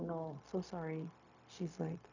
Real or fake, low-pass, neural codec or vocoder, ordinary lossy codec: fake; 7.2 kHz; codec, 16 kHz, 0.4 kbps, LongCat-Audio-Codec; none